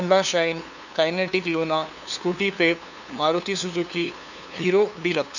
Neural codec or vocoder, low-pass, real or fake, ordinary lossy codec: codec, 16 kHz, 2 kbps, FunCodec, trained on LibriTTS, 25 frames a second; 7.2 kHz; fake; none